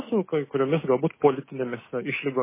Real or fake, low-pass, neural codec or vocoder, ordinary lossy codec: real; 3.6 kHz; none; MP3, 16 kbps